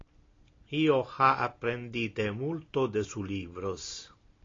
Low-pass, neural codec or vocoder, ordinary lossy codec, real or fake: 7.2 kHz; none; AAC, 32 kbps; real